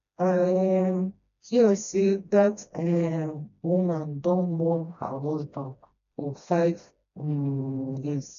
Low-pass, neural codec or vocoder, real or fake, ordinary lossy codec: 7.2 kHz; codec, 16 kHz, 1 kbps, FreqCodec, smaller model; fake; none